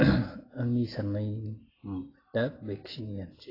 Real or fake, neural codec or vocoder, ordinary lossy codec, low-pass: real; none; AAC, 24 kbps; 5.4 kHz